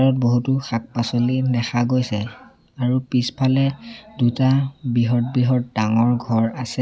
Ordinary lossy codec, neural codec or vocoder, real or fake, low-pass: none; none; real; none